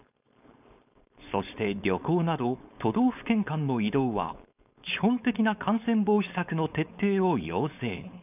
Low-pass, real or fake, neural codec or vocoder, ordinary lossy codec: 3.6 kHz; fake; codec, 16 kHz, 4.8 kbps, FACodec; none